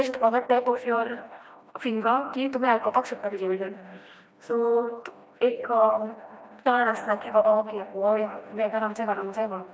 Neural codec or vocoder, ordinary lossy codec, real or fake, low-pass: codec, 16 kHz, 1 kbps, FreqCodec, smaller model; none; fake; none